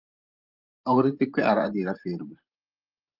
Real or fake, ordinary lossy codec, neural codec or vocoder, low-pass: fake; Opus, 32 kbps; vocoder, 44.1 kHz, 128 mel bands every 512 samples, BigVGAN v2; 5.4 kHz